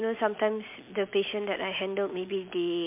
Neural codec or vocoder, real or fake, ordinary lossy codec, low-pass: none; real; MP3, 32 kbps; 3.6 kHz